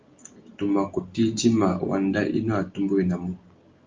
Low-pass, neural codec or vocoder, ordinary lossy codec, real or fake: 7.2 kHz; none; Opus, 24 kbps; real